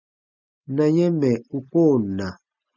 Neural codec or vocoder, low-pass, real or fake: none; 7.2 kHz; real